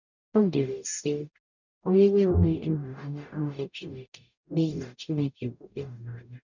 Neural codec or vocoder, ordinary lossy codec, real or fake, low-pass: codec, 44.1 kHz, 0.9 kbps, DAC; none; fake; 7.2 kHz